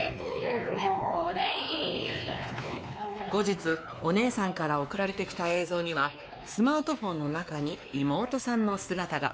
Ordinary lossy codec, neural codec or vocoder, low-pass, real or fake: none; codec, 16 kHz, 2 kbps, X-Codec, WavLM features, trained on Multilingual LibriSpeech; none; fake